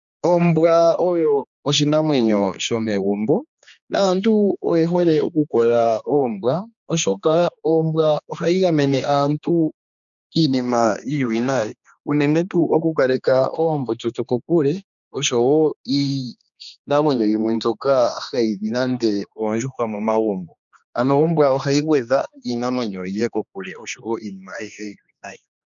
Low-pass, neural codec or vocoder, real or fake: 7.2 kHz; codec, 16 kHz, 2 kbps, X-Codec, HuBERT features, trained on general audio; fake